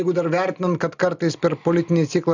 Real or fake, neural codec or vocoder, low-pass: real; none; 7.2 kHz